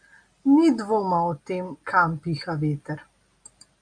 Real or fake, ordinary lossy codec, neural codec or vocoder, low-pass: real; MP3, 64 kbps; none; 9.9 kHz